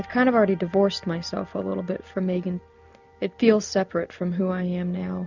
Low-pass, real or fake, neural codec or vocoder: 7.2 kHz; real; none